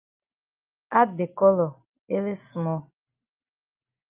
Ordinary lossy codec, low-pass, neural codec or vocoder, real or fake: Opus, 32 kbps; 3.6 kHz; none; real